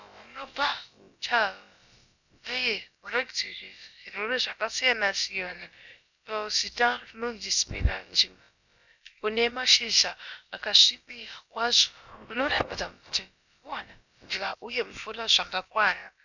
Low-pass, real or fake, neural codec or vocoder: 7.2 kHz; fake; codec, 16 kHz, about 1 kbps, DyCAST, with the encoder's durations